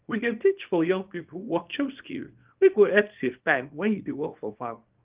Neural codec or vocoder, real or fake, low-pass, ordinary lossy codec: codec, 24 kHz, 0.9 kbps, WavTokenizer, small release; fake; 3.6 kHz; Opus, 32 kbps